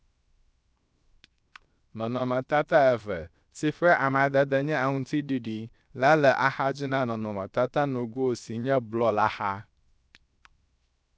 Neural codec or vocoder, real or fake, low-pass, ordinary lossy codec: codec, 16 kHz, 0.7 kbps, FocalCodec; fake; none; none